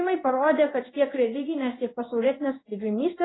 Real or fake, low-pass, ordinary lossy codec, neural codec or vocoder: fake; 7.2 kHz; AAC, 16 kbps; codec, 16 kHz in and 24 kHz out, 1 kbps, XY-Tokenizer